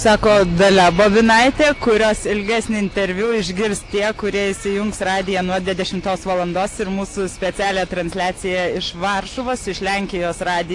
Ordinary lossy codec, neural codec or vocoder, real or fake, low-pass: AAC, 48 kbps; none; real; 10.8 kHz